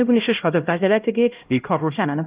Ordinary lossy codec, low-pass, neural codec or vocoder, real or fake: Opus, 32 kbps; 3.6 kHz; codec, 16 kHz, 0.5 kbps, X-Codec, HuBERT features, trained on LibriSpeech; fake